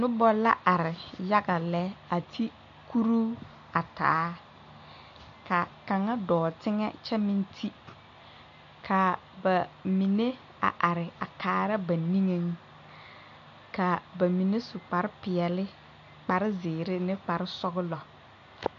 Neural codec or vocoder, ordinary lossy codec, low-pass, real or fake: none; MP3, 48 kbps; 7.2 kHz; real